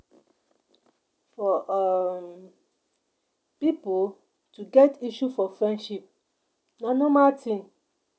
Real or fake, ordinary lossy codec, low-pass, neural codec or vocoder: real; none; none; none